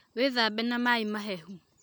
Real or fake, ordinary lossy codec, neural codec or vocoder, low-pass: real; none; none; none